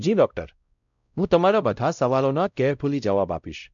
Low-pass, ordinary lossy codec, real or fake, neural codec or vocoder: 7.2 kHz; none; fake; codec, 16 kHz, 0.5 kbps, X-Codec, WavLM features, trained on Multilingual LibriSpeech